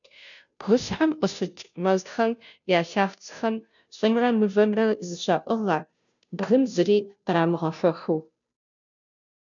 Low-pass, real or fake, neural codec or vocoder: 7.2 kHz; fake; codec, 16 kHz, 0.5 kbps, FunCodec, trained on Chinese and English, 25 frames a second